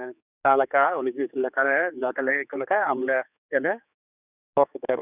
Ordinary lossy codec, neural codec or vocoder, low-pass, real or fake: none; codec, 16 kHz, 4 kbps, X-Codec, HuBERT features, trained on general audio; 3.6 kHz; fake